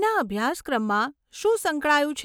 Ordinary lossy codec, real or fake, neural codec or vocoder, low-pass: none; real; none; none